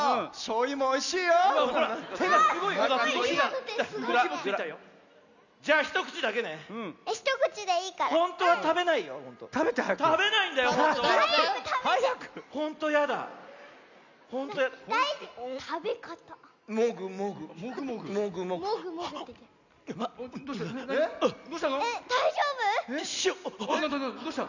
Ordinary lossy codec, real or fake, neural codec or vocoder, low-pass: none; real; none; 7.2 kHz